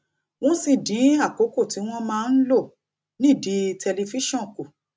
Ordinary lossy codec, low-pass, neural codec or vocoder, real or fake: none; none; none; real